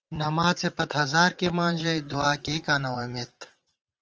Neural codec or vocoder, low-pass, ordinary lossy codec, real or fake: vocoder, 44.1 kHz, 80 mel bands, Vocos; 7.2 kHz; Opus, 24 kbps; fake